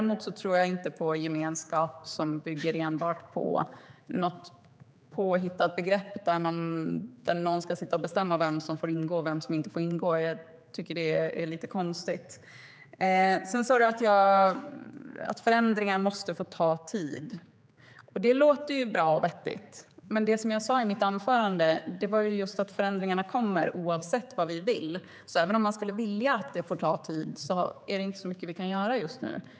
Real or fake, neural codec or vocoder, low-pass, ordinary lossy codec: fake; codec, 16 kHz, 4 kbps, X-Codec, HuBERT features, trained on general audio; none; none